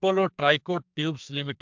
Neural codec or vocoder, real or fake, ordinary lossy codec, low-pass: codec, 44.1 kHz, 2.6 kbps, SNAC; fake; MP3, 64 kbps; 7.2 kHz